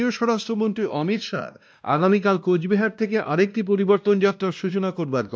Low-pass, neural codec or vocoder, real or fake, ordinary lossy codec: none; codec, 16 kHz, 1 kbps, X-Codec, WavLM features, trained on Multilingual LibriSpeech; fake; none